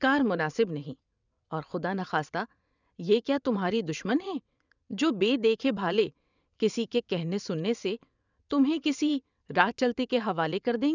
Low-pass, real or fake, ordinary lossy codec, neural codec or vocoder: 7.2 kHz; fake; none; vocoder, 22.05 kHz, 80 mel bands, WaveNeXt